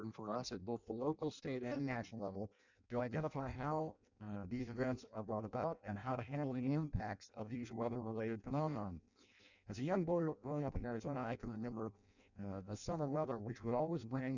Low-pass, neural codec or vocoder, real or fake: 7.2 kHz; codec, 16 kHz in and 24 kHz out, 0.6 kbps, FireRedTTS-2 codec; fake